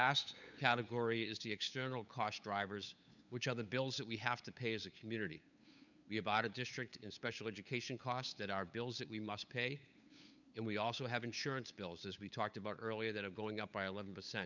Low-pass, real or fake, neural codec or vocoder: 7.2 kHz; fake; codec, 16 kHz, 8 kbps, FunCodec, trained on LibriTTS, 25 frames a second